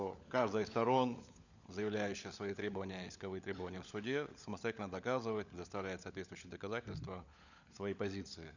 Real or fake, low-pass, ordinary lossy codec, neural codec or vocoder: fake; 7.2 kHz; none; codec, 16 kHz, 16 kbps, FunCodec, trained on LibriTTS, 50 frames a second